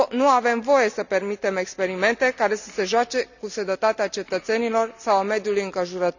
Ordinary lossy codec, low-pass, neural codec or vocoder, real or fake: none; 7.2 kHz; none; real